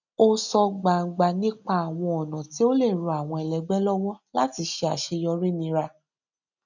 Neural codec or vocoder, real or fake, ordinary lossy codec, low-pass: none; real; none; 7.2 kHz